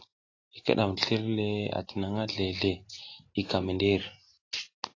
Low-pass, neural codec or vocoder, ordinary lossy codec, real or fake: 7.2 kHz; none; AAC, 32 kbps; real